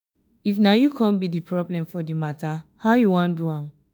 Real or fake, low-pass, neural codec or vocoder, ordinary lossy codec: fake; none; autoencoder, 48 kHz, 32 numbers a frame, DAC-VAE, trained on Japanese speech; none